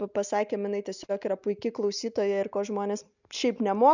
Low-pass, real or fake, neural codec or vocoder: 7.2 kHz; real; none